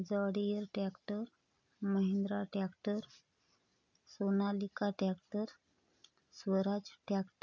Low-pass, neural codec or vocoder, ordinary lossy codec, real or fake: 7.2 kHz; none; none; real